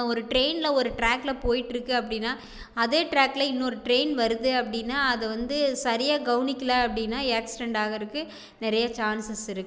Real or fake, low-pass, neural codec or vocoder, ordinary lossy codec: real; none; none; none